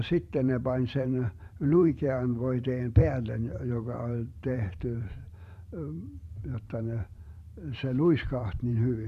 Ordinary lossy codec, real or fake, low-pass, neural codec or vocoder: MP3, 96 kbps; fake; 14.4 kHz; vocoder, 44.1 kHz, 128 mel bands every 512 samples, BigVGAN v2